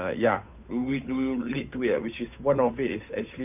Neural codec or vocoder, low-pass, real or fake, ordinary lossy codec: codec, 16 kHz, 8 kbps, FunCodec, trained on Chinese and English, 25 frames a second; 3.6 kHz; fake; none